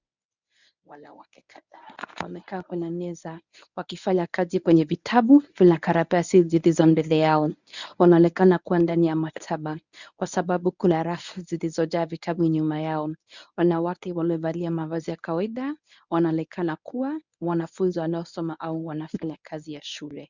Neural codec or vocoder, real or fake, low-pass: codec, 24 kHz, 0.9 kbps, WavTokenizer, medium speech release version 1; fake; 7.2 kHz